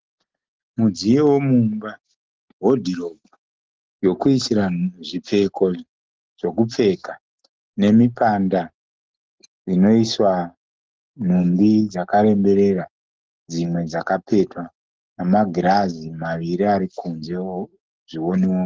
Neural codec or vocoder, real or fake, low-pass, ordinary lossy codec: none; real; 7.2 kHz; Opus, 16 kbps